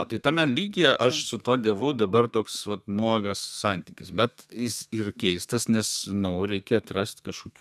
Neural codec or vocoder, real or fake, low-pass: codec, 32 kHz, 1.9 kbps, SNAC; fake; 14.4 kHz